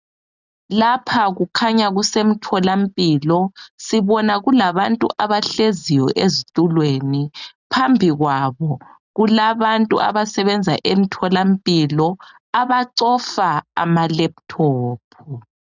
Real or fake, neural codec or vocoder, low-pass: real; none; 7.2 kHz